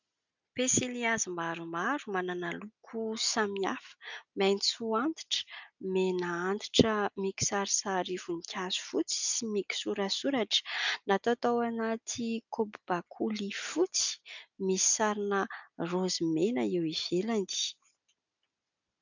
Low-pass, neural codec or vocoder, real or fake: 7.2 kHz; none; real